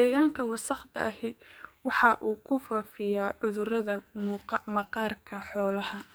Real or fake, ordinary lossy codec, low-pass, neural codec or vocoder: fake; none; none; codec, 44.1 kHz, 2.6 kbps, SNAC